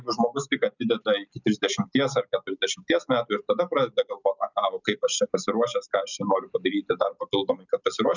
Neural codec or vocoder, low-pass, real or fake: none; 7.2 kHz; real